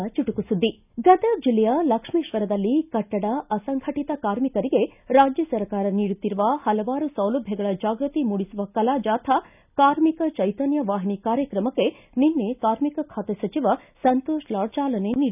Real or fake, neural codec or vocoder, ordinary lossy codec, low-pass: real; none; none; 3.6 kHz